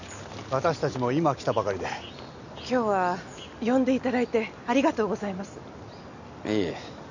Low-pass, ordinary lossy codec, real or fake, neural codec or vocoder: 7.2 kHz; none; real; none